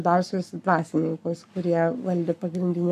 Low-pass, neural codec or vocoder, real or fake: 14.4 kHz; codec, 44.1 kHz, 7.8 kbps, Pupu-Codec; fake